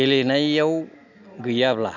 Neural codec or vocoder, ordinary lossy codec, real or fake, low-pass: none; none; real; 7.2 kHz